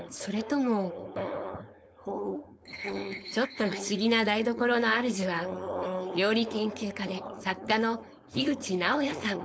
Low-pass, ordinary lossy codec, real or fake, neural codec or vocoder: none; none; fake; codec, 16 kHz, 4.8 kbps, FACodec